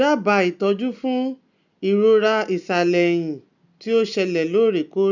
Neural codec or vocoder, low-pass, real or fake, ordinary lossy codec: none; 7.2 kHz; real; none